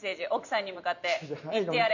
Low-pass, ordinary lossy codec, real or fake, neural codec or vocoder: 7.2 kHz; none; fake; vocoder, 44.1 kHz, 128 mel bands every 512 samples, BigVGAN v2